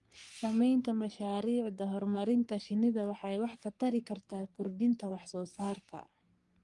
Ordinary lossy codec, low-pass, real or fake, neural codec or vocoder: Opus, 32 kbps; 10.8 kHz; fake; codec, 44.1 kHz, 3.4 kbps, Pupu-Codec